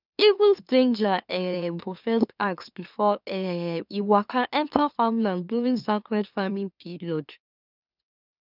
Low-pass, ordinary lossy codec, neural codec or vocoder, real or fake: 5.4 kHz; none; autoencoder, 44.1 kHz, a latent of 192 numbers a frame, MeloTTS; fake